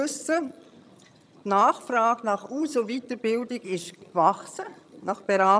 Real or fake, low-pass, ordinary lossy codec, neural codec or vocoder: fake; none; none; vocoder, 22.05 kHz, 80 mel bands, HiFi-GAN